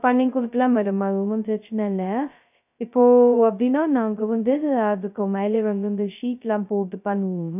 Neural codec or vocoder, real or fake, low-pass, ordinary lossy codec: codec, 16 kHz, 0.2 kbps, FocalCodec; fake; 3.6 kHz; none